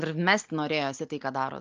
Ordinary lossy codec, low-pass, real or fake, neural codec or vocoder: Opus, 24 kbps; 7.2 kHz; real; none